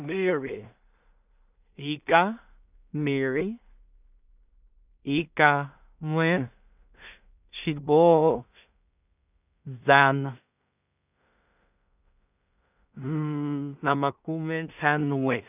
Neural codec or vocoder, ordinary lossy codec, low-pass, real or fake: codec, 16 kHz in and 24 kHz out, 0.4 kbps, LongCat-Audio-Codec, two codebook decoder; none; 3.6 kHz; fake